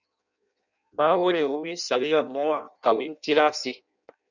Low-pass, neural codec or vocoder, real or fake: 7.2 kHz; codec, 16 kHz in and 24 kHz out, 0.6 kbps, FireRedTTS-2 codec; fake